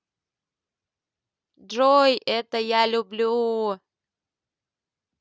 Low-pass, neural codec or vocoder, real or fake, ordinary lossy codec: none; none; real; none